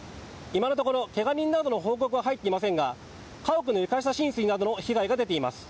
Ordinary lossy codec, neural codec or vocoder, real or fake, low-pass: none; none; real; none